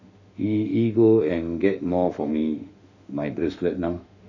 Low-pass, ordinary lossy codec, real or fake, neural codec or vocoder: 7.2 kHz; none; fake; autoencoder, 48 kHz, 32 numbers a frame, DAC-VAE, trained on Japanese speech